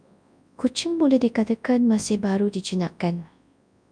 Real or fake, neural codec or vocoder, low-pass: fake; codec, 24 kHz, 0.9 kbps, WavTokenizer, large speech release; 9.9 kHz